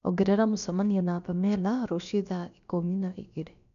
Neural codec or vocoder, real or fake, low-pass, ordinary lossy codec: codec, 16 kHz, about 1 kbps, DyCAST, with the encoder's durations; fake; 7.2 kHz; none